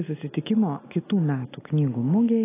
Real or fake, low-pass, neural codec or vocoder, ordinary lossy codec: real; 3.6 kHz; none; AAC, 16 kbps